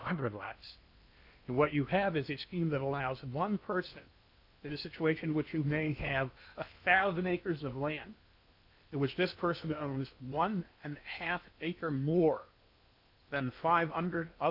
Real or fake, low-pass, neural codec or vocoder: fake; 5.4 kHz; codec, 16 kHz in and 24 kHz out, 0.8 kbps, FocalCodec, streaming, 65536 codes